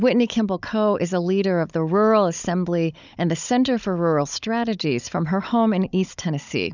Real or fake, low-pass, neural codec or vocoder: fake; 7.2 kHz; codec, 16 kHz, 16 kbps, FunCodec, trained on Chinese and English, 50 frames a second